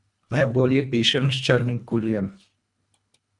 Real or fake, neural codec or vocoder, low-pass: fake; codec, 24 kHz, 1.5 kbps, HILCodec; 10.8 kHz